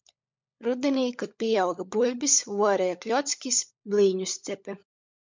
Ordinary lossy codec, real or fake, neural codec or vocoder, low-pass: MP3, 64 kbps; fake; codec, 16 kHz, 16 kbps, FunCodec, trained on LibriTTS, 50 frames a second; 7.2 kHz